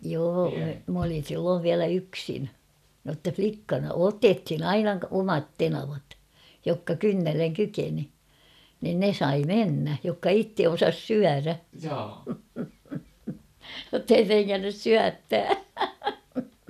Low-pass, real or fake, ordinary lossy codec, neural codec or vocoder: 14.4 kHz; real; none; none